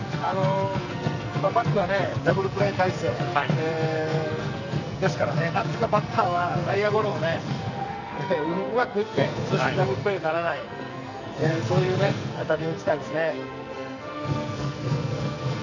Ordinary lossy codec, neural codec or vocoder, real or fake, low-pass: none; codec, 44.1 kHz, 2.6 kbps, SNAC; fake; 7.2 kHz